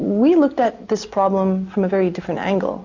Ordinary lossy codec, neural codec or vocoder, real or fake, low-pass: AAC, 48 kbps; none; real; 7.2 kHz